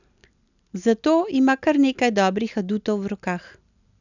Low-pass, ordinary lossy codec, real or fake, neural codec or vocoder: 7.2 kHz; none; real; none